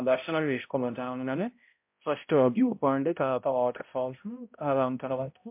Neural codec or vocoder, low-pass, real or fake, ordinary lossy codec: codec, 16 kHz, 0.5 kbps, X-Codec, HuBERT features, trained on balanced general audio; 3.6 kHz; fake; MP3, 32 kbps